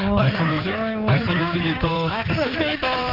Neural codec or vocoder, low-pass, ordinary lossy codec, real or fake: codec, 16 kHz in and 24 kHz out, 2.2 kbps, FireRedTTS-2 codec; 5.4 kHz; Opus, 24 kbps; fake